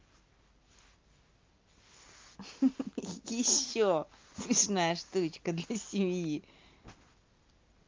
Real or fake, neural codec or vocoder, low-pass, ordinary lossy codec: real; none; 7.2 kHz; Opus, 24 kbps